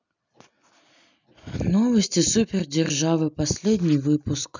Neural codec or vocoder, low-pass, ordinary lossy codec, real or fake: none; 7.2 kHz; none; real